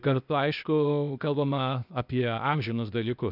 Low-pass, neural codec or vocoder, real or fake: 5.4 kHz; codec, 16 kHz, 0.8 kbps, ZipCodec; fake